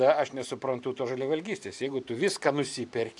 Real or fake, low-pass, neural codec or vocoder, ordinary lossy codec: fake; 10.8 kHz; vocoder, 44.1 kHz, 128 mel bands every 512 samples, BigVGAN v2; AAC, 64 kbps